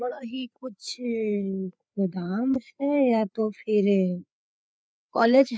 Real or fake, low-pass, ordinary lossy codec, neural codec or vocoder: fake; none; none; codec, 16 kHz, 4 kbps, FreqCodec, larger model